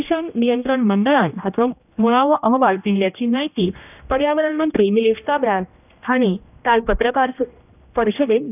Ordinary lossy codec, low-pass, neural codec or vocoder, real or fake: none; 3.6 kHz; codec, 16 kHz, 1 kbps, X-Codec, HuBERT features, trained on general audio; fake